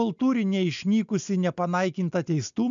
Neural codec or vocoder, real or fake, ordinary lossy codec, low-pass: none; real; MP3, 64 kbps; 7.2 kHz